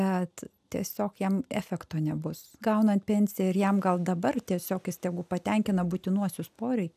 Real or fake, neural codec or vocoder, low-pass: real; none; 14.4 kHz